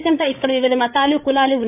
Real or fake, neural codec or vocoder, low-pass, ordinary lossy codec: fake; codec, 16 kHz, 8 kbps, FreqCodec, larger model; 3.6 kHz; none